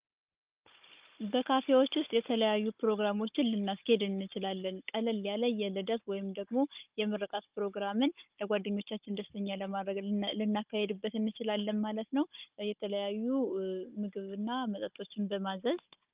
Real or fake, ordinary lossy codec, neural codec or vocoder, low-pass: fake; Opus, 32 kbps; codec, 16 kHz, 16 kbps, FunCodec, trained on Chinese and English, 50 frames a second; 3.6 kHz